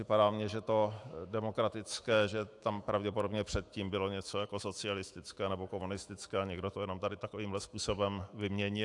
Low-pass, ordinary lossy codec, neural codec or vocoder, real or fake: 10.8 kHz; AAC, 64 kbps; autoencoder, 48 kHz, 128 numbers a frame, DAC-VAE, trained on Japanese speech; fake